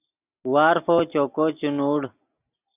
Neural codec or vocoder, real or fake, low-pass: none; real; 3.6 kHz